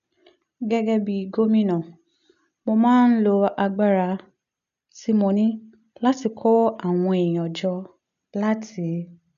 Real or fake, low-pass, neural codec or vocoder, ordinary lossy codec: real; 7.2 kHz; none; none